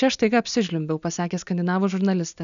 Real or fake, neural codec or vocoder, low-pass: real; none; 7.2 kHz